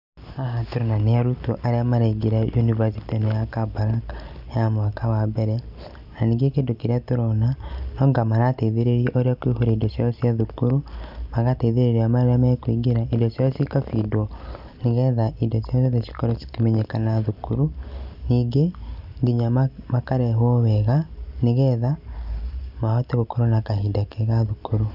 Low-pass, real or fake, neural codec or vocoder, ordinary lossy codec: 5.4 kHz; real; none; none